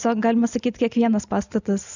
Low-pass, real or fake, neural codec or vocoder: 7.2 kHz; real; none